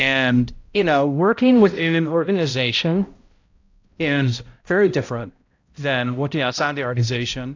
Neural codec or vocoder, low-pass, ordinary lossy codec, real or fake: codec, 16 kHz, 0.5 kbps, X-Codec, HuBERT features, trained on balanced general audio; 7.2 kHz; AAC, 48 kbps; fake